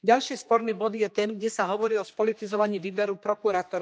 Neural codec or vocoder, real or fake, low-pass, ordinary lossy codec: codec, 16 kHz, 2 kbps, X-Codec, HuBERT features, trained on general audio; fake; none; none